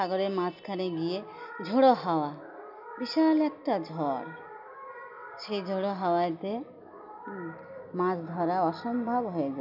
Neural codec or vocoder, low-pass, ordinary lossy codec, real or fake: none; 5.4 kHz; none; real